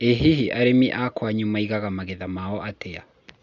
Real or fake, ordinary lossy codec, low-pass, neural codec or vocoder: real; Opus, 64 kbps; 7.2 kHz; none